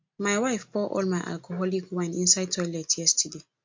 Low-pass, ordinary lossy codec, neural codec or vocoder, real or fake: 7.2 kHz; MP3, 48 kbps; none; real